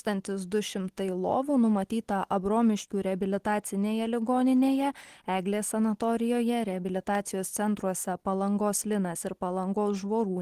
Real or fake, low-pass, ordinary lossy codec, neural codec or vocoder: real; 14.4 kHz; Opus, 16 kbps; none